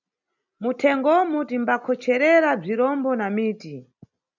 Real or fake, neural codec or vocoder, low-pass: real; none; 7.2 kHz